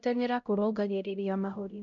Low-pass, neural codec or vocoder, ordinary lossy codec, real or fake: 7.2 kHz; codec, 16 kHz, 0.5 kbps, X-Codec, HuBERT features, trained on LibriSpeech; none; fake